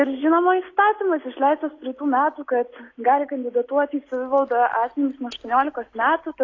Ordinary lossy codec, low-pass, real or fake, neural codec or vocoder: AAC, 32 kbps; 7.2 kHz; real; none